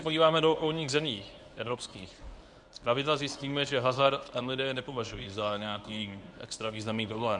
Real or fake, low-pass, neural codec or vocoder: fake; 10.8 kHz; codec, 24 kHz, 0.9 kbps, WavTokenizer, medium speech release version 1